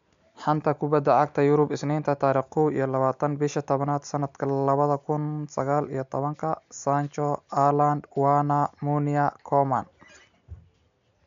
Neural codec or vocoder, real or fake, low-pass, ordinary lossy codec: none; real; 7.2 kHz; MP3, 64 kbps